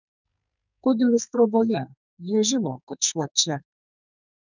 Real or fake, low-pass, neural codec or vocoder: fake; 7.2 kHz; codec, 44.1 kHz, 2.6 kbps, SNAC